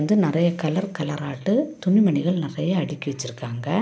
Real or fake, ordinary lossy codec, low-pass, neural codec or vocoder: real; none; none; none